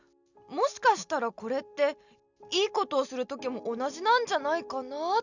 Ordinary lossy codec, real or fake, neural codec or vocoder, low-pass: none; real; none; 7.2 kHz